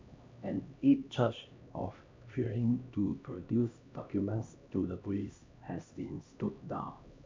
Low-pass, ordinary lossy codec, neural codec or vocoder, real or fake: 7.2 kHz; MP3, 64 kbps; codec, 16 kHz, 1 kbps, X-Codec, HuBERT features, trained on LibriSpeech; fake